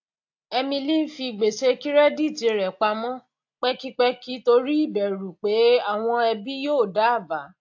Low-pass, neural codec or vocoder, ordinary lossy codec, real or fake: 7.2 kHz; none; AAC, 48 kbps; real